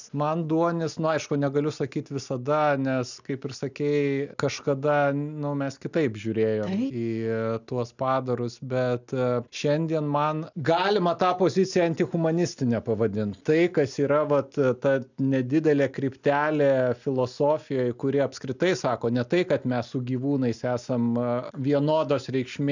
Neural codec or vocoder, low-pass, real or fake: none; 7.2 kHz; real